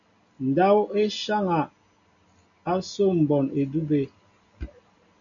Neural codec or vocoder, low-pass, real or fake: none; 7.2 kHz; real